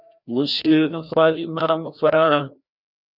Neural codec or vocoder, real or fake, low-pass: codec, 16 kHz, 1 kbps, FreqCodec, larger model; fake; 5.4 kHz